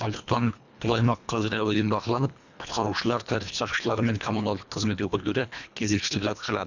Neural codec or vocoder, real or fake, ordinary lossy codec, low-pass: codec, 24 kHz, 1.5 kbps, HILCodec; fake; none; 7.2 kHz